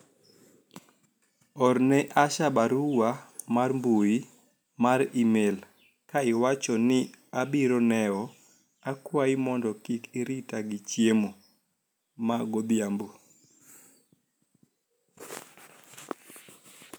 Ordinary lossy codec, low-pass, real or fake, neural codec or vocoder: none; none; real; none